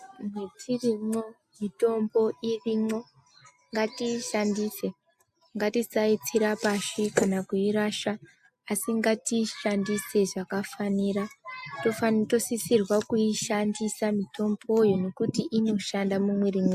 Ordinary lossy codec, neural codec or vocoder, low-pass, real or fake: AAC, 96 kbps; none; 14.4 kHz; real